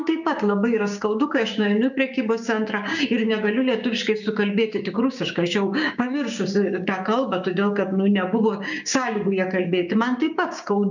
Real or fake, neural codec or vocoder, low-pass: fake; codec, 16 kHz, 6 kbps, DAC; 7.2 kHz